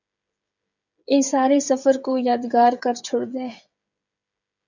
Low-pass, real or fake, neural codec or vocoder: 7.2 kHz; fake; codec, 16 kHz, 16 kbps, FreqCodec, smaller model